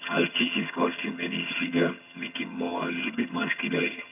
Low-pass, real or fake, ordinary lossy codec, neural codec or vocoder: 3.6 kHz; fake; none; vocoder, 22.05 kHz, 80 mel bands, HiFi-GAN